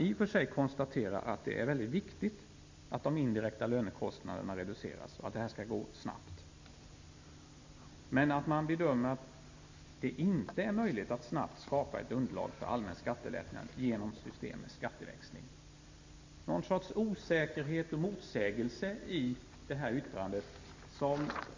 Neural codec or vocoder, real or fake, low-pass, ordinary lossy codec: none; real; 7.2 kHz; MP3, 64 kbps